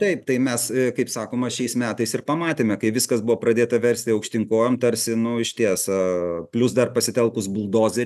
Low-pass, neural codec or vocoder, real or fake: 14.4 kHz; none; real